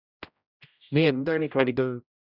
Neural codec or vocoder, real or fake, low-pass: codec, 16 kHz, 0.5 kbps, X-Codec, HuBERT features, trained on general audio; fake; 5.4 kHz